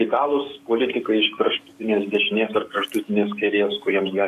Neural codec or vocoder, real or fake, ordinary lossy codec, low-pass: codec, 44.1 kHz, 7.8 kbps, DAC; fake; AAC, 64 kbps; 14.4 kHz